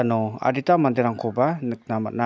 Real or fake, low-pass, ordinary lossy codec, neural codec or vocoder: real; none; none; none